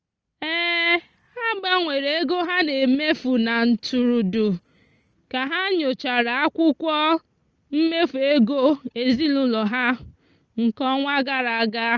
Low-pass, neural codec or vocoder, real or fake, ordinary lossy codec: 7.2 kHz; none; real; Opus, 24 kbps